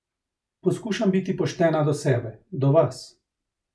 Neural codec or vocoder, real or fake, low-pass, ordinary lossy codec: none; real; none; none